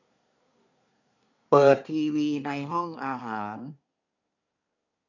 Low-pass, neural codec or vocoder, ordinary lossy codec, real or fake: 7.2 kHz; codec, 24 kHz, 1 kbps, SNAC; none; fake